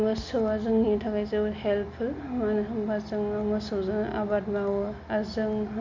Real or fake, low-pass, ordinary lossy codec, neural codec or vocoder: real; 7.2 kHz; MP3, 64 kbps; none